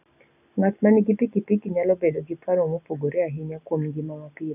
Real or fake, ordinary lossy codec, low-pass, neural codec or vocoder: real; none; 3.6 kHz; none